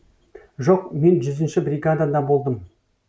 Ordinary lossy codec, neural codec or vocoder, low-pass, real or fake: none; none; none; real